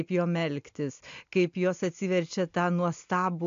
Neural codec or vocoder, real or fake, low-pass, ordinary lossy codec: none; real; 7.2 kHz; AAC, 64 kbps